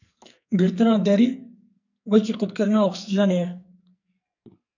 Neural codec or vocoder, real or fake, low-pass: codec, 44.1 kHz, 2.6 kbps, SNAC; fake; 7.2 kHz